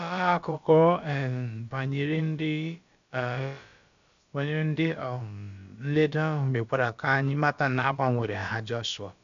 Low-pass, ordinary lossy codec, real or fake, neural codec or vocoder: 7.2 kHz; MP3, 64 kbps; fake; codec, 16 kHz, about 1 kbps, DyCAST, with the encoder's durations